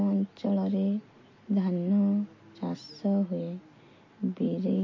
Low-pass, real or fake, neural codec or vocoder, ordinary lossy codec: 7.2 kHz; real; none; MP3, 32 kbps